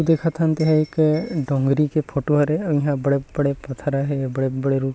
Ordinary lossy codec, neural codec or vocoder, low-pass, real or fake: none; none; none; real